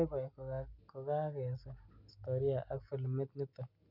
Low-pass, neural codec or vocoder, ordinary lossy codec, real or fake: 5.4 kHz; none; none; real